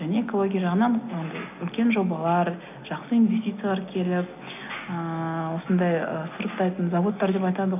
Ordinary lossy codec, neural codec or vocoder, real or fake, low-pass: none; none; real; 3.6 kHz